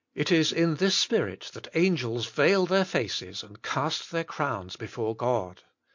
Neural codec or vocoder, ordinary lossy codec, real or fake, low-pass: none; MP3, 48 kbps; real; 7.2 kHz